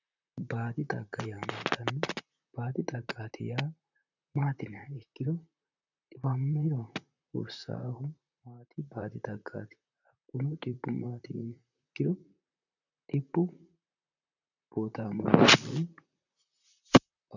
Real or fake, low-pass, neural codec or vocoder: fake; 7.2 kHz; vocoder, 44.1 kHz, 128 mel bands, Pupu-Vocoder